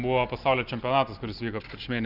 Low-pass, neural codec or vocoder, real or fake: 5.4 kHz; none; real